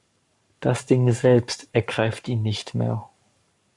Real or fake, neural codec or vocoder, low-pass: fake; codec, 44.1 kHz, 7.8 kbps, Pupu-Codec; 10.8 kHz